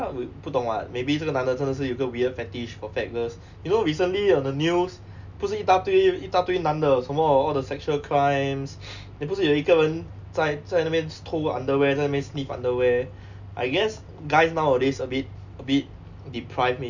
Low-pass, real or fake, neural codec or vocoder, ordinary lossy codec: 7.2 kHz; real; none; none